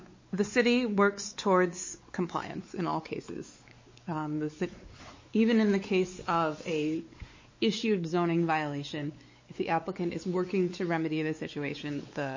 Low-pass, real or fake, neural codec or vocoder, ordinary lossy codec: 7.2 kHz; fake; codec, 16 kHz, 4 kbps, X-Codec, WavLM features, trained on Multilingual LibriSpeech; MP3, 32 kbps